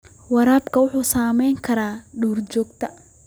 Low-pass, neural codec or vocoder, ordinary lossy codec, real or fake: none; vocoder, 44.1 kHz, 128 mel bands every 256 samples, BigVGAN v2; none; fake